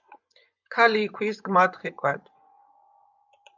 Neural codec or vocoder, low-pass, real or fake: vocoder, 24 kHz, 100 mel bands, Vocos; 7.2 kHz; fake